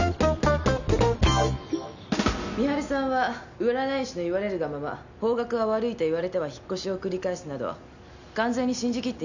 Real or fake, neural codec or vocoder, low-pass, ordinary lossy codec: real; none; 7.2 kHz; none